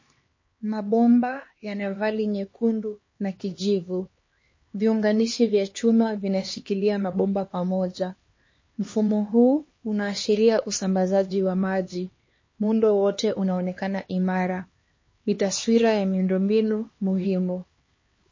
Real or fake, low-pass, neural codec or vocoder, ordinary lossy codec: fake; 7.2 kHz; codec, 16 kHz, 2 kbps, X-Codec, HuBERT features, trained on LibriSpeech; MP3, 32 kbps